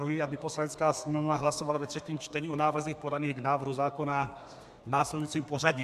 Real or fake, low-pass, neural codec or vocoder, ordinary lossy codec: fake; 14.4 kHz; codec, 32 kHz, 1.9 kbps, SNAC; MP3, 96 kbps